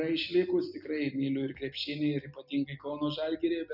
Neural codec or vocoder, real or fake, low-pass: none; real; 5.4 kHz